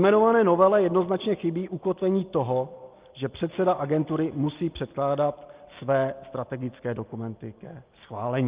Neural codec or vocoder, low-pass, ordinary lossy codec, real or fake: none; 3.6 kHz; Opus, 32 kbps; real